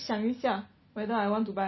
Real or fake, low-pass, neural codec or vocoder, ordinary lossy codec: real; 7.2 kHz; none; MP3, 24 kbps